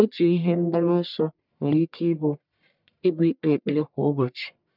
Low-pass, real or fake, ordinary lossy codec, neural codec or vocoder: 5.4 kHz; fake; none; codec, 44.1 kHz, 1.7 kbps, Pupu-Codec